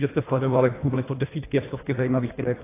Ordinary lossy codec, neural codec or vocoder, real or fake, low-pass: AAC, 16 kbps; codec, 24 kHz, 1.5 kbps, HILCodec; fake; 3.6 kHz